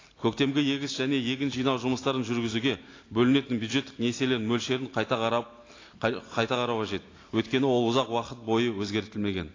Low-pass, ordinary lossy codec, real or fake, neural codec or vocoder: 7.2 kHz; AAC, 32 kbps; real; none